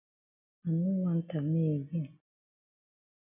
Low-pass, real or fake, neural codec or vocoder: 3.6 kHz; real; none